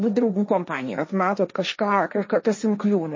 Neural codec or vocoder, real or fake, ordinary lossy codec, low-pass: codec, 16 kHz, 1.1 kbps, Voila-Tokenizer; fake; MP3, 32 kbps; 7.2 kHz